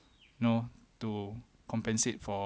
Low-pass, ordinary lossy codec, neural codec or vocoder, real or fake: none; none; none; real